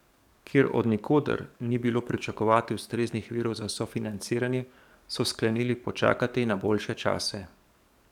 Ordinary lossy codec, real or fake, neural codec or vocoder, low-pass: none; fake; codec, 44.1 kHz, 7.8 kbps, DAC; 19.8 kHz